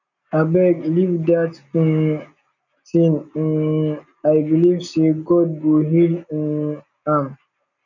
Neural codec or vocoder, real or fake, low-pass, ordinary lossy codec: none; real; 7.2 kHz; none